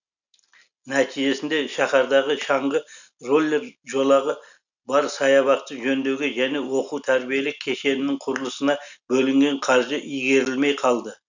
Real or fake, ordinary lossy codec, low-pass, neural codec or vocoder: real; none; 7.2 kHz; none